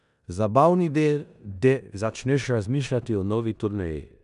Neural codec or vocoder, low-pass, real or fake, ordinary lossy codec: codec, 16 kHz in and 24 kHz out, 0.9 kbps, LongCat-Audio-Codec, four codebook decoder; 10.8 kHz; fake; none